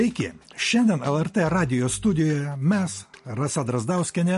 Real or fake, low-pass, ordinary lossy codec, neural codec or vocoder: real; 14.4 kHz; MP3, 48 kbps; none